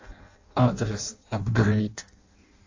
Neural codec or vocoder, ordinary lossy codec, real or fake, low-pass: codec, 16 kHz in and 24 kHz out, 0.6 kbps, FireRedTTS-2 codec; AAC, 48 kbps; fake; 7.2 kHz